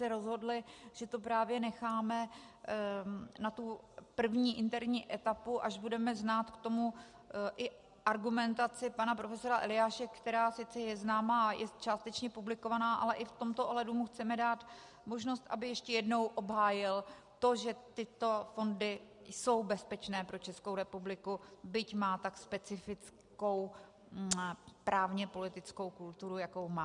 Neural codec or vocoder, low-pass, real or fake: none; 10.8 kHz; real